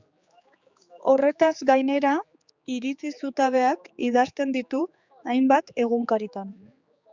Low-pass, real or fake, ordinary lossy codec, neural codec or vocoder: 7.2 kHz; fake; Opus, 64 kbps; codec, 16 kHz, 4 kbps, X-Codec, HuBERT features, trained on general audio